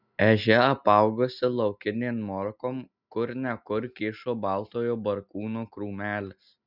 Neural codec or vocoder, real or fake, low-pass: none; real; 5.4 kHz